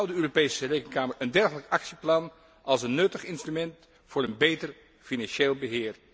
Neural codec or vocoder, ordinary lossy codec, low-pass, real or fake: none; none; none; real